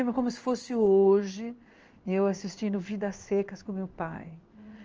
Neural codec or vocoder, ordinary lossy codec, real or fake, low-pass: codec, 16 kHz in and 24 kHz out, 1 kbps, XY-Tokenizer; Opus, 24 kbps; fake; 7.2 kHz